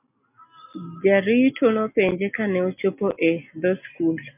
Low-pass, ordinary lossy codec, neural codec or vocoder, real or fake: 3.6 kHz; MP3, 32 kbps; none; real